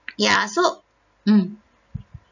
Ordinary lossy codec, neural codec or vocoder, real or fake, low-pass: none; none; real; 7.2 kHz